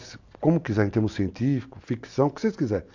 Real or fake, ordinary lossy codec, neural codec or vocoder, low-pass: real; AAC, 48 kbps; none; 7.2 kHz